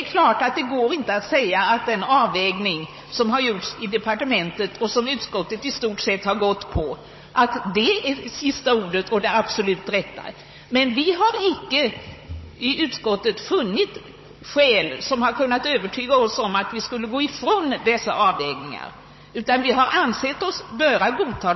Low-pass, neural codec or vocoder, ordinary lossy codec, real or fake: 7.2 kHz; codec, 16 kHz, 16 kbps, FunCodec, trained on Chinese and English, 50 frames a second; MP3, 24 kbps; fake